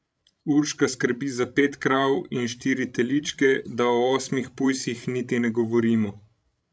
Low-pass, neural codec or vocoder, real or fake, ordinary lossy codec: none; codec, 16 kHz, 16 kbps, FreqCodec, larger model; fake; none